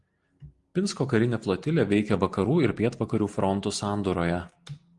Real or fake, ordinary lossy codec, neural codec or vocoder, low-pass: real; Opus, 24 kbps; none; 10.8 kHz